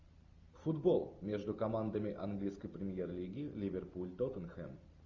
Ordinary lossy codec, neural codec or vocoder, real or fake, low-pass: Opus, 64 kbps; none; real; 7.2 kHz